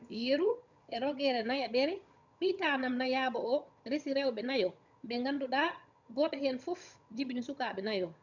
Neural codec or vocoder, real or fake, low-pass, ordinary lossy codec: vocoder, 22.05 kHz, 80 mel bands, HiFi-GAN; fake; 7.2 kHz; none